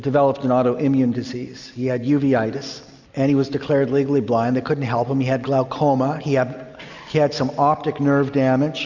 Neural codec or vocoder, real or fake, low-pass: none; real; 7.2 kHz